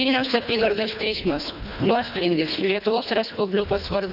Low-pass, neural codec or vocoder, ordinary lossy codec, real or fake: 5.4 kHz; codec, 24 kHz, 1.5 kbps, HILCodec; AAC, 48 kbps; fake